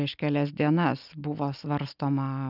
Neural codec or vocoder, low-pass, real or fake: none; 5.4 kHz; real